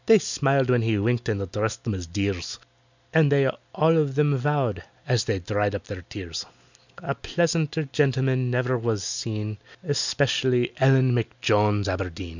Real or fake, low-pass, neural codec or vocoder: real; 7.2 kHz; none